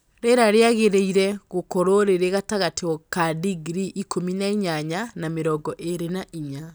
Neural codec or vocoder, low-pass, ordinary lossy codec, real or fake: none; none; none; real